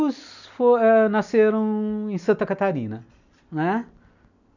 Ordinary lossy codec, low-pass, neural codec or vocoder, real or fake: none; 7.2 kHz; none; real